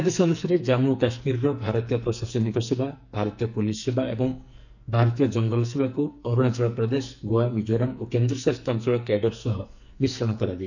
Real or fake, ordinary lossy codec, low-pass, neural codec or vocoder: fake; none; 7.2 kHz; codec, 44.1 kHz, 2.6 kbps, SNAC